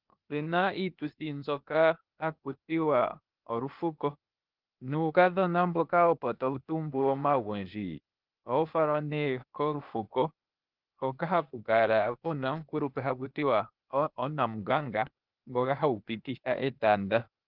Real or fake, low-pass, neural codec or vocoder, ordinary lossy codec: fake; 5.4 kHz; codec, 16 kHz, 0.8 kbps, ZipCodec; Opus, 24 kbps